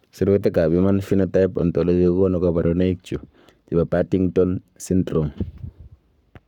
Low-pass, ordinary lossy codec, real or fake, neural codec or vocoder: 19.8 kHz; none; fake; codec, 44.1 kHz, 7.8 kbps, Pupu-Codec